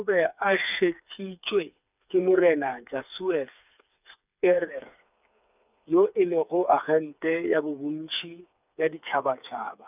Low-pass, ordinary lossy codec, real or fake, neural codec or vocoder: 3.6 kHz; none; fake; codec, 16 kHz, 4 kbps, FreqCodec, smaller model